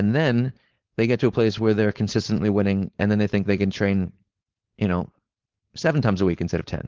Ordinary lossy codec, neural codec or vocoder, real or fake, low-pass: Opus, 16 kbps; codec, 16 kHz, 4.8 kbps, FACodec; fake; 7.2 kHz